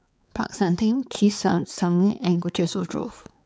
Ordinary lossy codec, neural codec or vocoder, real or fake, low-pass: none; codec, 16 kHz, 4 kbps, X-Codec, HuBERT features, trained on balanced general audio; fake; none